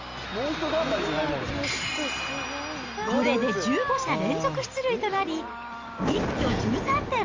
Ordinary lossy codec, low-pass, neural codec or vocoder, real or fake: Opus, 32 kbps; 7.2 kHz; none; real